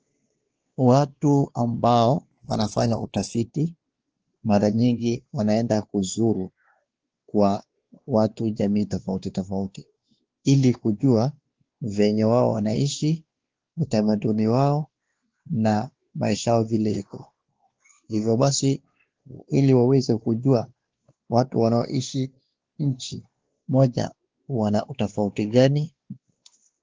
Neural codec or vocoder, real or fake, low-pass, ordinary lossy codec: codec, 16 kHz, 2 kbps, X-Codec, WavLM features, trained on Multilingual LibriSpeech; fake; 7.2 kHz; Opus, 16 kbps